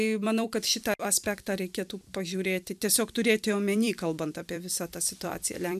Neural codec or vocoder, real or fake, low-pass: vocoder, 44.1 kHz, 128 mel bands every 256 samples, BigVGAN v2; fake; 14.4 kHz